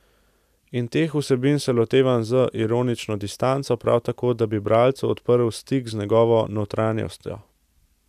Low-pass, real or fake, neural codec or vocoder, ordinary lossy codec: 14.4 kHz; real; none; none